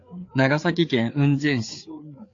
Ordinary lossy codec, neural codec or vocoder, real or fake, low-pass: AAC, 48 kbps; codec, 16 kHz, 4 kbps, FreqCodec, larger model; fake; 7.2 kHz